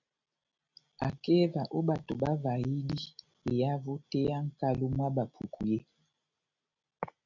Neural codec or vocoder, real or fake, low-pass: none; real; 7.2 kHz